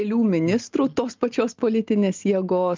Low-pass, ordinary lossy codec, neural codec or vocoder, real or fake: 7.2 kHz; Opus, 32 kbps; none; real